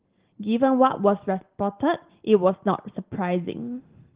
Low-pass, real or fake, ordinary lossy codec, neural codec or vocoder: 3.6 kHz; real; Opus, 16 kbps; none